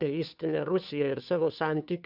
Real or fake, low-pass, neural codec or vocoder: fake; 5.4 kHz; codec, 16 kHz, 2 kbps, FunCodec, trained on Chinese and English, 25 frames a second